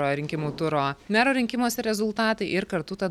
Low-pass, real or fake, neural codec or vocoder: 19.8 kHz; real; none